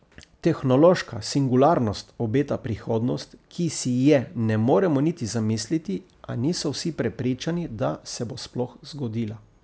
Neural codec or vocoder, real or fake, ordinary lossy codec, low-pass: none; real; none; none